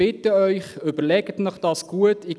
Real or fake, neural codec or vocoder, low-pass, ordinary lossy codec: real; none; none; none